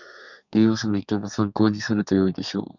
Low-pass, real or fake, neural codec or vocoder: 7.2 kHz; fake; codec, 32 kHz, 1.9 kbps, SNAC